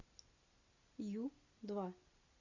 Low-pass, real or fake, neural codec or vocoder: 7.2 kHz; real; none